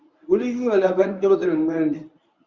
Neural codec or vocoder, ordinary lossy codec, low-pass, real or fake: codec, 24 kHz, 0.9 kbps, WavTokenizer, medium speech release version 1; Opus, 64 kbps; 7.2 kHz; fake